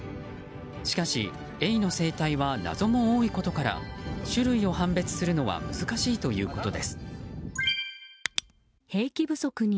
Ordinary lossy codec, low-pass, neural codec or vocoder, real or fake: none; none; none; real